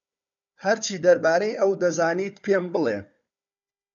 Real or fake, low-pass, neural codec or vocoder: fake; 7.2 kHz; codec, 16 kHz, 4 kbps, FunCodec, trained on Chinese and English, 50 frames a second